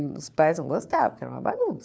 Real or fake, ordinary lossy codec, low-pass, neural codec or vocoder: fake; none; none; codec, 16 kHz, 4 kbps, FunCodec, trained on LibriTTS, 50 frames a second